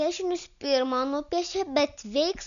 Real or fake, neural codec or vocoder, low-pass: real; none; 7.2 kHz